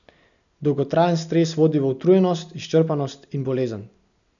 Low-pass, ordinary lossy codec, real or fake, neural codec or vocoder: 7.2 kHz; none; real; none